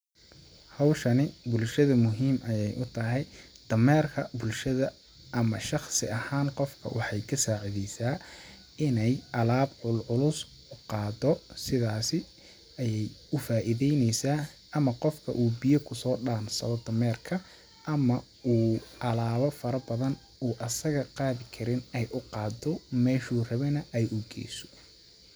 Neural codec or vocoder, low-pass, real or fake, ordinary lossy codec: none; none; real; none